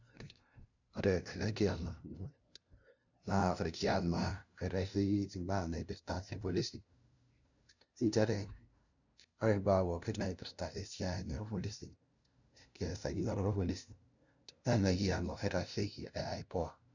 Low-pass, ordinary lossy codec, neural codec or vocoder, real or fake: 7.2 kHz; none; codec, 16 kHz, 0.5 kbps, FunCodec, trained on LibriTTS, 25 frames a second; fake